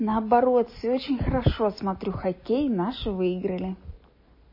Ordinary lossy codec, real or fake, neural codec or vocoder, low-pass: MP3, 24 kbps; real; none; 5.4 kHz